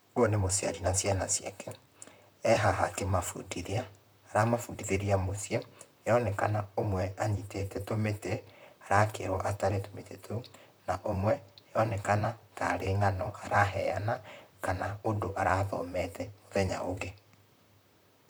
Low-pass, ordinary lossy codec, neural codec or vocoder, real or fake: none; none; vocoder, 44.1 kHz, 128 mel bands, Pupu-Vocoder; fake